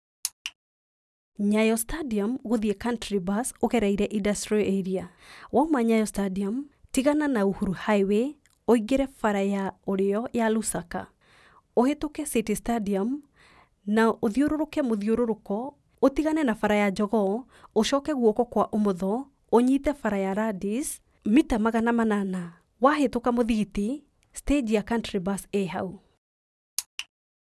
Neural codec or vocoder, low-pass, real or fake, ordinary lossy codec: none; none; real; none